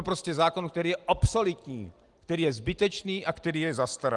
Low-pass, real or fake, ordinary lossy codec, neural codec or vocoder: 10.8 kHz; real; Opus, 24 kbps; none